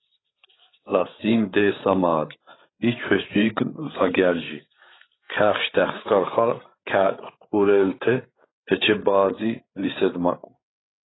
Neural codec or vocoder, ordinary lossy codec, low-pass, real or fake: codec, 16 kHz, 8 kbps, FreqCodec, larger model; AAC, 16 kbps; 7.2 kHz; fake